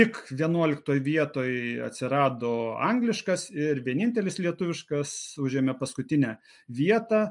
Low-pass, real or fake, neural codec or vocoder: 10.8 kHz; real; none